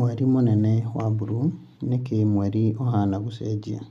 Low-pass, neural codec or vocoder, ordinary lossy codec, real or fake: 14.4 kHz; none; none; real